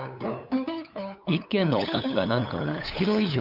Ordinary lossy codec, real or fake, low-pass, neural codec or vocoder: none; fake; 5.4 kHz; codec, 16 kHz, 8 kbps, FunCodec, trained on LibriTTS, 25 frames a second